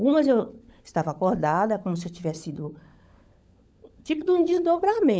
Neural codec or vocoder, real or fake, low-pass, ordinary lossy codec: codec, 16 kHz, 16 kbps, FunCodec, trained on LibriTTS, 50 frames a second; fake; none; none